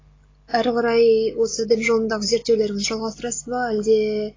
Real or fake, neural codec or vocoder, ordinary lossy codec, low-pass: real; none; AAC, 32 kbps; 7.2 kHz